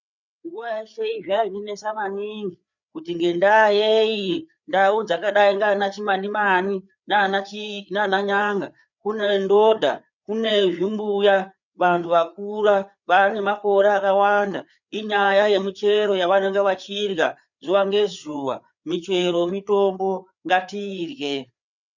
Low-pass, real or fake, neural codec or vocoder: 7.2 kHz; fake; codec, 16 kHz, 4 kbps, FreqCodec, larger model